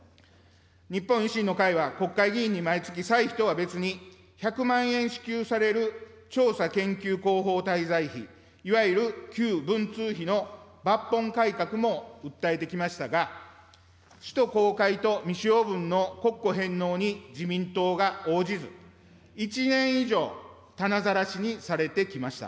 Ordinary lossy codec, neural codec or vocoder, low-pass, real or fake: none; none; none; real